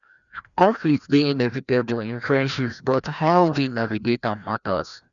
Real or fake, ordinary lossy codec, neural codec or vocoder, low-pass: fake; none; codec, 16 kHz, 1 kbps, FreqCodec, larger model; 7.2 kHz